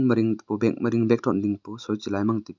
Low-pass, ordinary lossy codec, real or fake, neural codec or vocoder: 7.2 kHz; none; real; none